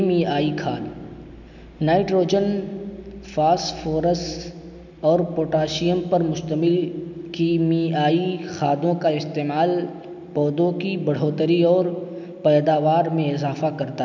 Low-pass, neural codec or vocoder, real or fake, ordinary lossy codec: 7.2 kHz; none; real; none